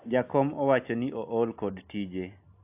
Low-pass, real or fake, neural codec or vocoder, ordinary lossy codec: 3.6 kHz; real; none; AAC, 32 kbps